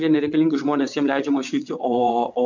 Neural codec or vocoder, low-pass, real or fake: vocoder, 22.05 kHz, 80 mel bands, WaveNeXt; 7.2 kHz; fake